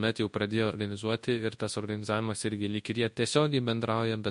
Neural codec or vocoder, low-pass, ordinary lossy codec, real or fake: codec, 24 kHz, 0.9 kbps, WavTokenizer, large speech release; 10.8 kHz; MP3, 48 kbps; fake